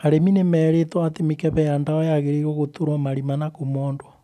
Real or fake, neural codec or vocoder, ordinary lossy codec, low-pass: real; none; none; 14.4 kHz